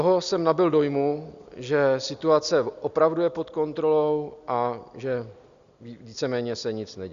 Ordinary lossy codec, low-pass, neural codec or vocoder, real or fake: Opus, 64 kbps; 7.2 kHz; none; real